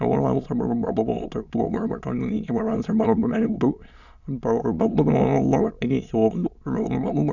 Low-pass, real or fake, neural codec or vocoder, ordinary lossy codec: 7.2 kHz; fake; autoencoder, 22.05 kHz, a latent of 192 numbers a frame, VITS, trained on many speakers; none